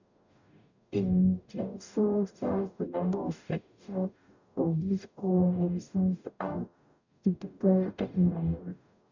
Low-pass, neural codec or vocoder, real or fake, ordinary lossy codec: 7.2 kHz; codec, 44.1 kHz, 0.9 kbps, DAC; fake; none